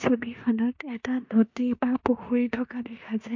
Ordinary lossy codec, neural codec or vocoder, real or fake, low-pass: MP3, 48 kbps; codec, 16 kHz in and 24 kHz out, 0.9 kbps, LongCat-Audio-Codec, four codebook decoder; fake; 7.2 kHz